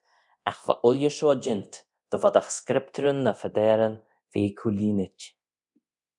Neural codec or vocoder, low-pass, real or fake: codec, 24 kHz, 0.9 kbps, DualCodec; 10.8 kHz; fake